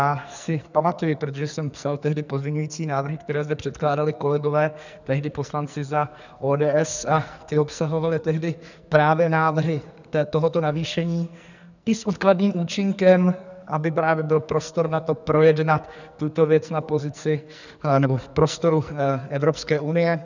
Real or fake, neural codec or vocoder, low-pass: fake; codec, 44.1 kHz, 2.6 kbps, SNAC; 7.2 kHz